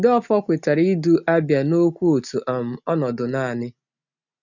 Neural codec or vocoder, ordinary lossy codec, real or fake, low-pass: none; none; real; 7.2 kHz